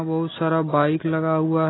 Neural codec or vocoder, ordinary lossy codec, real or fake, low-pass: none; AAC, 16 kbps; real; 7.2 kHz